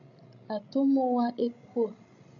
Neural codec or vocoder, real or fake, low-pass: codec, 16 kHz, 16 kbps, FreqCodec, larger model; fake; 7.2 kHz